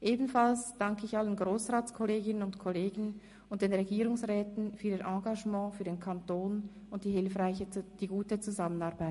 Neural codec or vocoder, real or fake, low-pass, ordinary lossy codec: none; real; 14.4 kHz; MP3, 48 kbps